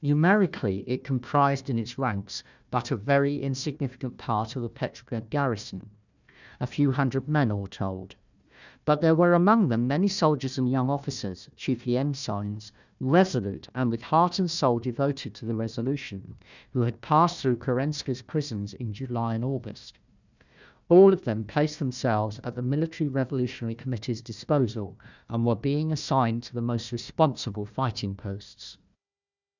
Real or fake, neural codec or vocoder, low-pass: fake; codec, 16 kHz, 1 kbps, FunCodec, trained on Chinese and English, 50 frames a second; 7.2 kHz